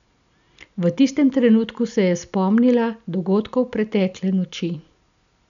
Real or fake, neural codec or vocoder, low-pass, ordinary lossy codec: real; none; 7.2 kHz; none